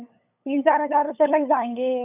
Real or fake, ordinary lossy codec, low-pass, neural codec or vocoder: fake; none; 3.6 kHz; codec, 16 kHz, 8 kbps, FunCodec, trained on LibriTTS, 25 frames a second